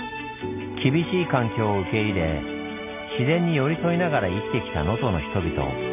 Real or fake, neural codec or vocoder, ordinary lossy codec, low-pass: real; none; none; 3.6 kHz